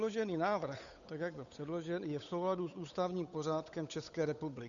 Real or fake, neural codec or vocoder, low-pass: fake; codec, 16 kHz, 8 kbps, FunCodec, trained on Chinese and English, 25 frames a second; 7.2 kHz